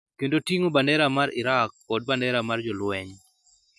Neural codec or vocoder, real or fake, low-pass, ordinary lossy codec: none; real; none; none